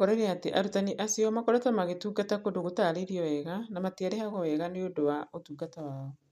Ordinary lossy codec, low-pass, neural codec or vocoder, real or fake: MP3, 64 kbps; 14.4 kHz; none; real